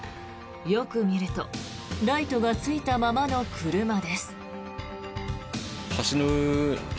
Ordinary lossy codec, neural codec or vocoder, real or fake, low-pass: none; none; real; none